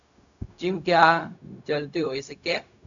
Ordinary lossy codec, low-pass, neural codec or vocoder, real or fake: MP3, 96 kbps; 7.2 kHz; codec, 16 kHz, 0.4 kbps, LongCat-Audio-Codec; fake